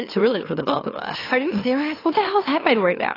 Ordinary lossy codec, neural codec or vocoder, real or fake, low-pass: AAC, 24 kbps; autoencoder, 44.1 kHz, a latent of 192 numbers a frame, MeloTTS; fake; 5.4 kHz